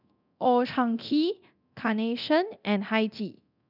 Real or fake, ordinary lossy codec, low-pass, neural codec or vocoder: fake; none; 5.4 kHz; codec, 24 kHz, 0.9 kbps, DualCodec